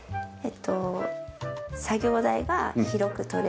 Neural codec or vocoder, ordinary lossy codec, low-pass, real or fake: none; none; none; real